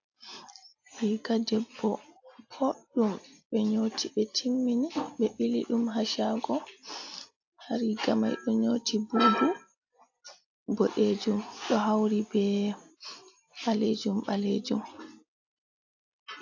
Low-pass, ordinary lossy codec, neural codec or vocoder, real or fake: 7.2 kHz; AAC, 48 kbps; none; real